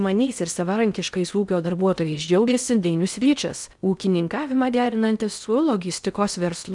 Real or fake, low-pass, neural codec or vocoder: fake; 10.8 kHz; codec, 16 kHz in and 24 kHz out, 0.6 kbps, FocalCodec, streaming, 4096 codes